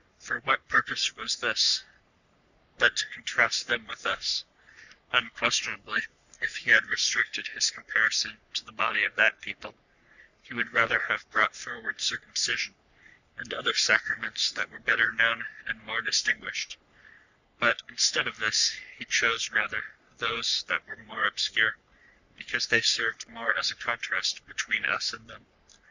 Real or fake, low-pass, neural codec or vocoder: fake; 7.2 kHz; codec, 44.1 kHz, 3.4 kbps, Pupu-Codec